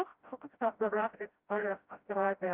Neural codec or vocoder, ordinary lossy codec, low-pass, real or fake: codec, 16 kHz, 0.5 kbps, FreqCodec, smaller model; Opus, 32 kbps; 3.6 kHz; fake